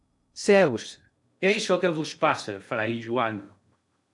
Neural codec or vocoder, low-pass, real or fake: codec, 16 kHz in and 24 kHz out, 0.6 kbps, FocalCodec, streaming, 2048 codes; 10.8 kHz; fake